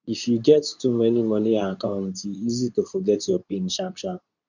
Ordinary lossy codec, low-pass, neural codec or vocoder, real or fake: none; 7.2 kHz; codec, 24 kHz, 0.9 kbps, WavTokenizer, medium speech release version 2; fake